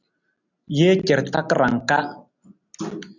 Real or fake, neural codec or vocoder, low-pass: real; none; 7.2 kHz